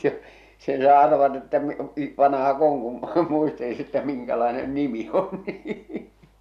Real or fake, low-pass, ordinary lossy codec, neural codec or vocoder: real; 14.4 kHz; none; none